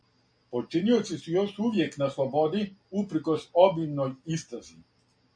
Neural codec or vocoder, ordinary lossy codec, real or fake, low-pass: none; MP3, 48 kbps; real; 9.9 kHz